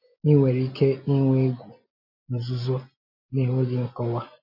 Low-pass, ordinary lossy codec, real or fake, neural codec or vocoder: 5.4 kHz; MP3, 32 kbps; real; none